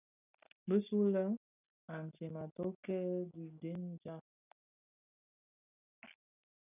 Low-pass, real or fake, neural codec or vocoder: 3.6 kHz; real; none